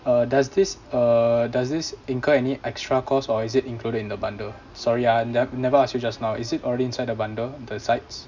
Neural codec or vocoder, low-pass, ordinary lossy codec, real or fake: none; 7.2 kHz; none; real